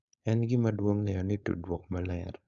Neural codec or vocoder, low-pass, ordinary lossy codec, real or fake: codec, 16 kHz, 4.8 kbps, FACodec; 7.2 kHz; none; fake